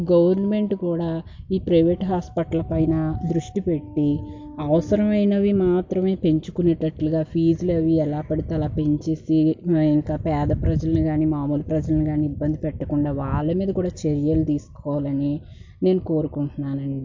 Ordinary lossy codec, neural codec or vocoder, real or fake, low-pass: MP3, 48 kbps; none; real; 7.2 kHz